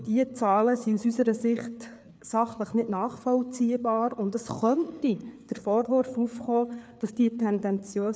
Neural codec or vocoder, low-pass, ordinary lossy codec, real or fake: codec, 16 kHz, 4 kbps, FreqCodec, larger model; none; none; fake